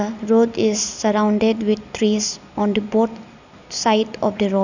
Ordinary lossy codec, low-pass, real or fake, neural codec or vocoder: none; 7.2 kHz; real; none